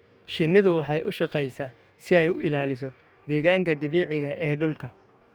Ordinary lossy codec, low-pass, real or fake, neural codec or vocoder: none; none; fake; codec, 44.1 kHz, 2.6 kbps, DAC